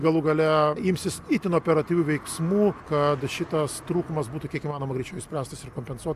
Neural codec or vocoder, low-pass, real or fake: none; 14.4 kHz; real